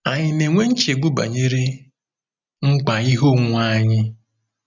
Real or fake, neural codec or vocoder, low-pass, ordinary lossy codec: real; none; 7.2 kHz; none